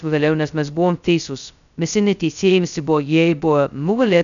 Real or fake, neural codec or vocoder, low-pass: fake; codec, 16 kHz, 0.2 kbps, FocalCodec; 7.2 kHz